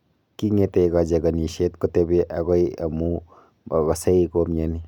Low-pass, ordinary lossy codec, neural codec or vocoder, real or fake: 19.8 kHz; none; none; real